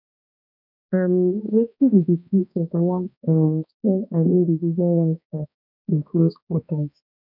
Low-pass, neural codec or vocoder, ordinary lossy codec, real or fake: 5.4 kHz; codec, 16 kHz, 1 kbps, X-Codec, HuBERT features, trained on balanced general audio; none; fake